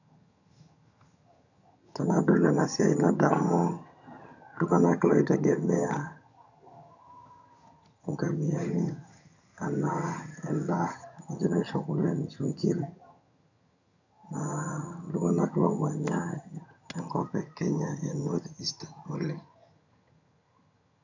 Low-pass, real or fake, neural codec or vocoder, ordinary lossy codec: 7.2 kHz; fake; vocoder, 22.05 kHz, 80 mel bands, HiFi-GAN; none